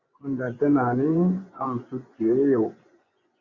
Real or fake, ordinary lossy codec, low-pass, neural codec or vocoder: fake; Opus, 64 kbps; 7.2 kHz; vocoder, 24 kHz, 100 mel bands, Vocos